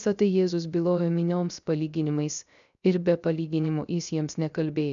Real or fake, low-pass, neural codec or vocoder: fake; 7.2 kHz; codec, 16 kHz, 0.3 kbps, FocalCodec